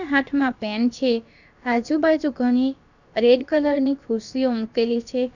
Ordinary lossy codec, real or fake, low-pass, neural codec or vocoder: none; fake; 7.2 kHz; codec, 16 kHz, about 1 kbps, DyCAST, with the encoder's durations